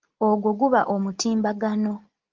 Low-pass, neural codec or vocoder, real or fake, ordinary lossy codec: 7.2 kHz; none; real; Opus, 32 kbps